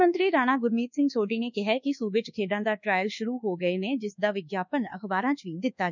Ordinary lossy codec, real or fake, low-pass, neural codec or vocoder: none; fake; 7.2 kHz; codec, 24 kHz, 1.2 kbps, DualCodec